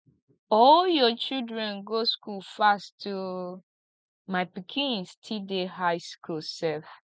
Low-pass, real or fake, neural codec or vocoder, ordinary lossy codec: none; real; none; none